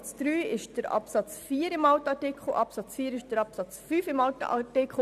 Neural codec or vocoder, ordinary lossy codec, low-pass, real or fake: none; none; 14.4 kHz; real